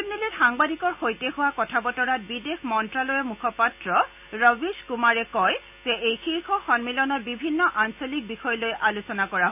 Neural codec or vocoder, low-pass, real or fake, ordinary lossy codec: none; 3.6 kHz; real; none